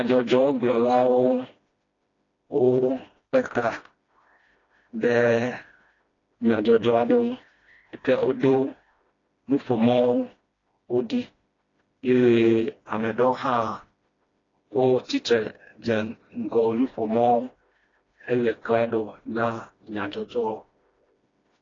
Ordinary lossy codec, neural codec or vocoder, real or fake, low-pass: AAC, 32 kbps; codec, 16 kHz, 1 kbps, FreqCodec, smaller model; fake; 7.2 kHz